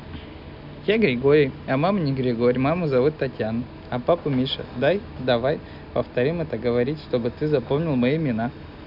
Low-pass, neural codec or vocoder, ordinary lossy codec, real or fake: 5.4 kHz; none; none; real